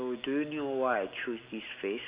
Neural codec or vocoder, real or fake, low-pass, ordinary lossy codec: none; real; 3.6 kHz; Opus, 64 kbps